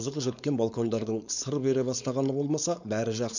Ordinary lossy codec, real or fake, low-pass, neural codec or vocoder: none; fake; 7.2 kHz; codec, 16 kHz, 4.8 kbps, FACodec